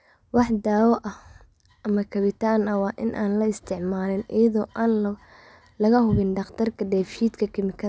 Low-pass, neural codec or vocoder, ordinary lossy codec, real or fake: none; none; none; real